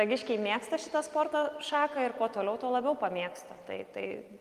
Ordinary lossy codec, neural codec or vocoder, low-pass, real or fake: Opus, 32 kbps; none; 14.4 kHz; real